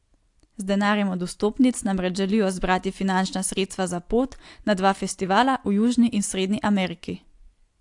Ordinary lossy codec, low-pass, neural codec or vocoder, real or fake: AAC, 64 kbps; 10.8 kHz; none; real